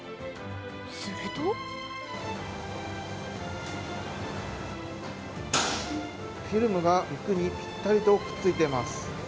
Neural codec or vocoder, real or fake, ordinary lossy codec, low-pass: none; real; none; none